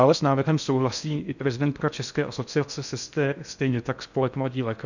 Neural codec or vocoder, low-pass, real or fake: codec, 16 kHz in and 24 kHz out, 0.6 kbps, FocalCodec, streaming, 4096 codes; 7.2 kHz; fake